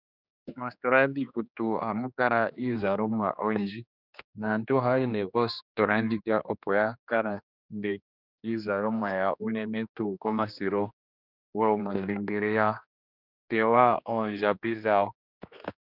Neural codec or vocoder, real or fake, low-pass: codec, 16 kHz, 2 kbps, X-Codec, HuBERT features, trained on general audio; fake; 5.4 kHz